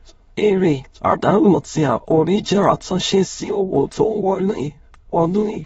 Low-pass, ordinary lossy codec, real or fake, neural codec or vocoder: 9.9 kHz; AAC, 24 kbps; fake; autoencoder, 22.05 kHz, a latent of 192 numbers a frame, VITS, trained on many speakers